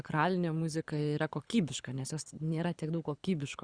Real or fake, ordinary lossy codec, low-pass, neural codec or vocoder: fake; Opus, 64 kbps; 9.9 kHz; codec, 24 kHz, 6 kbps, HILCodec